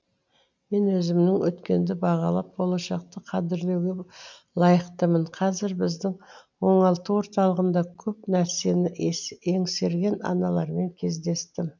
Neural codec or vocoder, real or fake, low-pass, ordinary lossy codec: none; real; 7.2 kHz; none